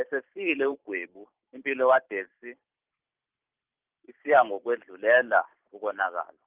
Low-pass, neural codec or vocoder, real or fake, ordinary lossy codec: 3.6 kHz; vocoder, 44.1 kHz, 128 mel bands every 512 samples, BigVGAN v2; fake; Opus, 24 kbps